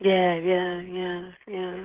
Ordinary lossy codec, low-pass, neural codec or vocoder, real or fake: Opus, 16 kbps; 3.6 kHz; codec, 16 kHz, 16 kbps, FreqCodec, smaller model; fake